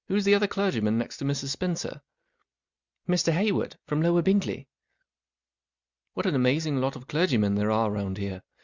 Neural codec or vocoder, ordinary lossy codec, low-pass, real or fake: none; Opus, 64 kbps; 7.2 kHz; real